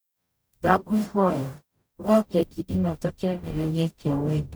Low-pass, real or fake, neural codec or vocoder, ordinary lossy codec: none; fake; codec, 44.1 kHz, 0.9 kbps, DAC; none